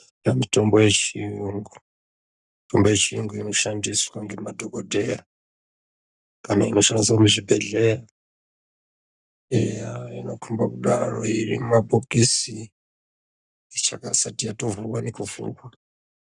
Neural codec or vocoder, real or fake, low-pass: codec, 44.1 kHz, 7.8 kbps, Pupu-Codec; fake; 10.8 kHz